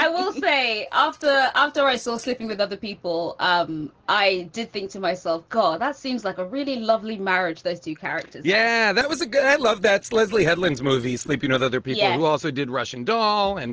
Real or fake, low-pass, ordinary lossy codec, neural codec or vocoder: real; 7.2 kHz; Opus, 16 kbps; none